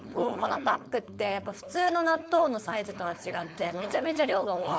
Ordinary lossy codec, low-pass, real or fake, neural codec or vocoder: none; none; fake; codec, 16 kHz, 4.8 kbps, FACodec